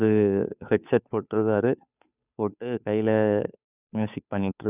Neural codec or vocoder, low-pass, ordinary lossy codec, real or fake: codec, 16 kHz, 2 kbps, FunCodec, trained on Chinese and English, 25 frames a second; 3.6 kHz; none; fake